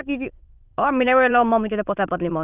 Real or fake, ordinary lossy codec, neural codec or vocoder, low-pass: fake; Opus, 32 kbps; autoencoder, 22.05 kHz, a latent of 192 numbers a frame, VITS, trained on many speakers; 3.6 kHz